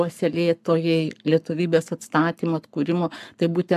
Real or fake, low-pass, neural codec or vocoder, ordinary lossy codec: fake; 14.4 kHz; codec, 44.1 kHz, 7.8 kbps, Pupu-Codec; AAC, 96 kbps